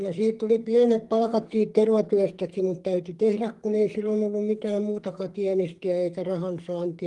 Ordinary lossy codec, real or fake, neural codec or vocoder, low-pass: Opus, 24 kbps; fake; codec, 44.1 kHz, 2.6 kbps, SNAC; 10.8 kHz